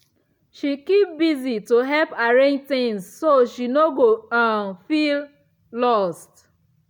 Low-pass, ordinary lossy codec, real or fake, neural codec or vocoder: 19.8 kHz; none; real; none